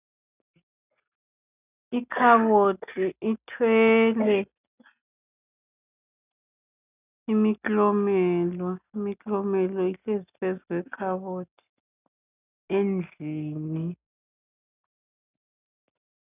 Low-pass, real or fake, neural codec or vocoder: 3.6 kHz; real; none